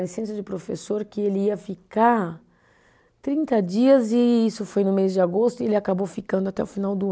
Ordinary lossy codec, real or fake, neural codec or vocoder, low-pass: none; real; none; none